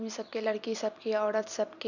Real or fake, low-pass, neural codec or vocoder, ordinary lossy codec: fake; 7.2 kHz; vocoder, 44.1 kHz, 128 mel bands every 256 samples, BigVGAN v2; none